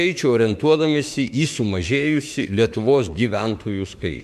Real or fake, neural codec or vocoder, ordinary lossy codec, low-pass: fake; autoencoder, 48 kHz, 32 numbers a frame, DAC-VAE, trained on Japanese speech; MP3, 96 kbps; 14.4 kHz